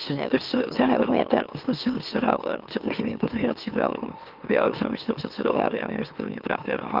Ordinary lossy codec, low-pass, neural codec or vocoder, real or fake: Opus, 32 kbps; 5.4 kHz; autoencoder, 44.1 kHz, a latent of 192 numbers a frame, MeloTTS; fake